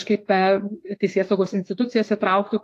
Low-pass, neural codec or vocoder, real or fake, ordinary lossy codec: 14.4 kHz; autoencoder, 48 kHz, 32 numbers a frame, DAC-VAE, trained on Japanese speech; fake; AAC, 48 kbps